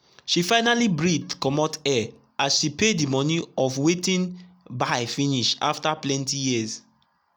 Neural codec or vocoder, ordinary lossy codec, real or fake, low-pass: none; none; real; none